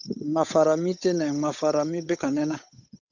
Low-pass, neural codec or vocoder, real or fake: 7.2 kHz; codec, 16 kHz, 8 kbps, FunCodec, trained on Chinese and English, 25 frames a second; fake